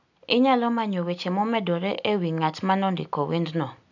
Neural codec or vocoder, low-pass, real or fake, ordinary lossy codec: none; 7.2 kHz; real; none